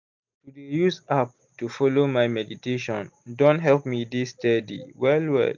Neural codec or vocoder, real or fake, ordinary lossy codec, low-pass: none; real; none; 7.2 kHz